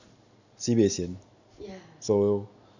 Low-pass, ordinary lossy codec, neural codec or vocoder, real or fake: 7.2 kHz; none; none; real